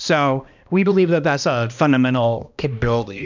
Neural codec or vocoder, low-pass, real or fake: codec, 16 kHz, 1 kbps, X-Codec, HuBERT features, trained on balanced general audio; 7.2 kHz; fake